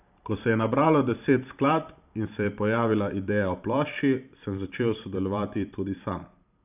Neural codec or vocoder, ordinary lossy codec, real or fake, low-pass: vocoder, 44.1 kHz, 128 mel bands every 512 samples, BigVGAN v2; none; fake; 3.6 kHz